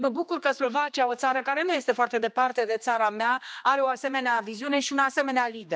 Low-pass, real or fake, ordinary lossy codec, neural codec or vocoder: none; fake; none; codec, 16 kHz, 2 kbps, X-Codec, HuBERT features, trained on general audio